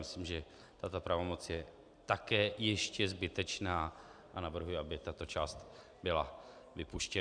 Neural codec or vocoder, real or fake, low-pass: none; real; 9.9 kHz